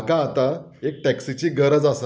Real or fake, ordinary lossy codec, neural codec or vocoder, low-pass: real; none; none; none